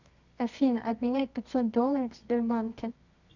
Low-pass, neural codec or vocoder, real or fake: 7.2 kHz; codec, 24 kHz, 0.9 kbps, WavTokenizer, medium music audio release; fake